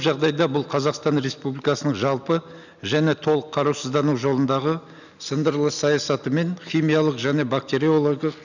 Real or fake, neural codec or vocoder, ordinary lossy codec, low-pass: real; none; none; 7.2 kHz